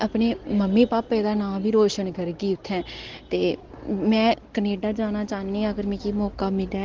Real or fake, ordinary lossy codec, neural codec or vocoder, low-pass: real; Opus, 16 kbps; none; 7.2 kHz